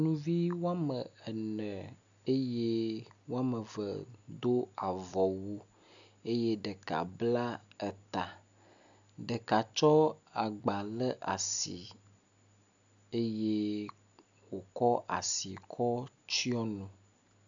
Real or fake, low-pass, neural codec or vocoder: real; 7.2 kHz; none